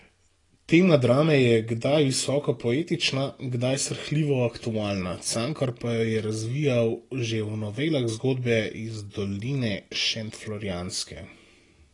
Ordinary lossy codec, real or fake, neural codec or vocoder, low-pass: AAC, 32 kbps; real; none; 10.8 kHz